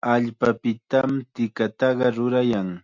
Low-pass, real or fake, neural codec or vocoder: 7.2 kHz; real; none